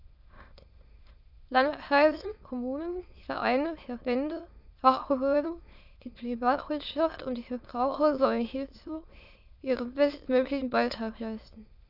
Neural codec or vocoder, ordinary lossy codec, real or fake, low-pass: autoencoder, 22.05 kHz, a latent of 192 numbers a frame, VITS, trained on many speakers; none; fake; 5.4 kHz